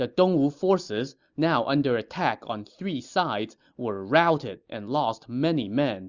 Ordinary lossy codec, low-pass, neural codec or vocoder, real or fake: Opus, 64 kbps; 7.2 kHz; none; real